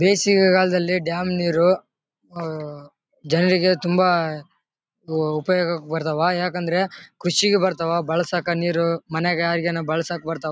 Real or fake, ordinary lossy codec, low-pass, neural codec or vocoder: real; none; none; none